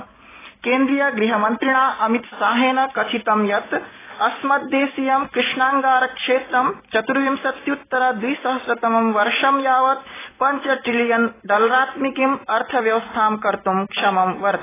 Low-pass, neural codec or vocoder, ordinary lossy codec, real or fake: 3.6 kHz; none; AAC, 16 kbps; real